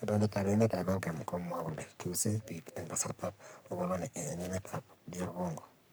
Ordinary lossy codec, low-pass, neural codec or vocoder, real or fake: none; none; codec, 44.1 kHz, 3.4 kbps, Pupu-Codec; fake